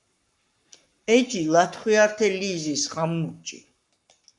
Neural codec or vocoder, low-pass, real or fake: codec, 44.1 kHz, 7.8 kbps, Pupu-Codec; 10.8 kHz; fake